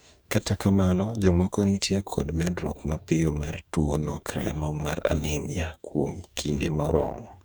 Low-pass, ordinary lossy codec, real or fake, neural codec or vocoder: none; none; fake; codec, 44.1 kHz, 2.6 kbps, DAC